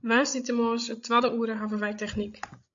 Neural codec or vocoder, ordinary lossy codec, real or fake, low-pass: codec, 16 kHz, 16 kbps, FreqCodec, larger model; MP3, 48 kbps; fake; 7.2 kHz